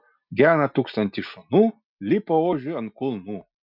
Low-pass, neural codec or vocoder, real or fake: 5.4 kHz; none; real